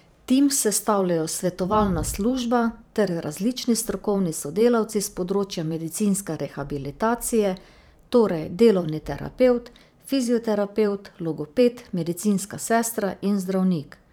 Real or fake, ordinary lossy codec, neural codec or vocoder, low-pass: fake; none; vocoder, 44.1 kHz, 128 mel bands every 512 samples, BigVGAN v2; none